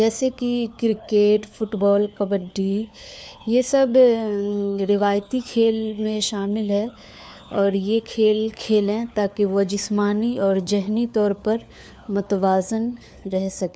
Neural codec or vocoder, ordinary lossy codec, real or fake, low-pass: codec, 16 kHz, 4 kbps, FunCodec, trained on LibriTTS, 50 frames a second; none; fake; none